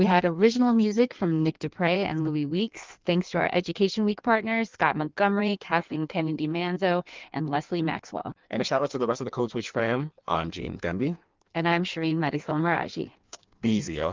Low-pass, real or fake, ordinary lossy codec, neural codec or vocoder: 7.2 kHz; fake; Opus, 24 kbps; codec, 16 kHz in and 24 kHz out, 1.1 kbps, FireRedTTS-2 codec